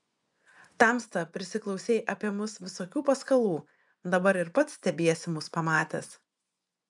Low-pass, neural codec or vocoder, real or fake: 10.8 kHz; vocoder, 24 kHz, 100 mel bands, Vocos; fake